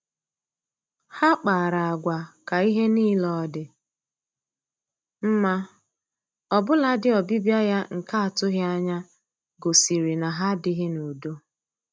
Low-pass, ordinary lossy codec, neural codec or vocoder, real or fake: none; none; none; real